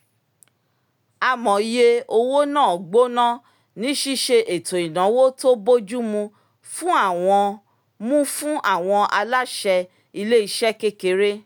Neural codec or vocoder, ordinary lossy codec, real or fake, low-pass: none; none; real; none